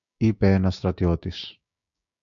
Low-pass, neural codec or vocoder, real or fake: 7.2 kHz; codec, 16 kHz, 6 kbps, DAC; fake